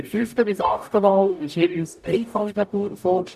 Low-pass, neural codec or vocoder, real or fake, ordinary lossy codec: 14.4 kHz; codec, 44.1 kHz, 0.9 kbps, DAC; fake; none